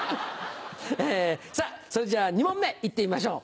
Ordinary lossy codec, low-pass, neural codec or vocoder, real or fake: none; none; none; real